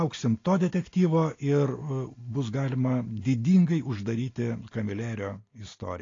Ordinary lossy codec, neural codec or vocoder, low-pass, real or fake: AAC, 32 kbps; none; 7.2 kHz; real